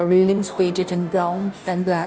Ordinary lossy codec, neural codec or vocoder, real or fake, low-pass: none; codec, 16 kHz, 0.5 kbps, FunCodec, trained on Chinese and English, 25 frames a second; fake; none